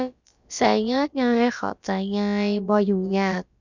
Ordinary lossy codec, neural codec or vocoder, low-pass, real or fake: none; codec, 16 kHz, about 1 kbps, DyCAST, with the encoder's durations; 7.2 kHz; fake